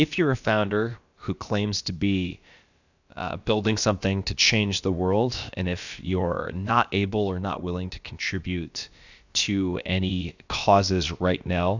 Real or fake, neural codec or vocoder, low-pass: fake; codec, 16 kHz, about 1 kbps, DyCAST, with the encoder's durations; 7.2 kHz